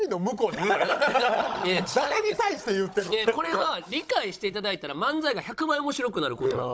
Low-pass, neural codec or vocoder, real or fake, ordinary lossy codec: none; codec, 16 kHz, 16 kbps, FunCodec, trained on Chinese and English, 50 frames a second; fake; none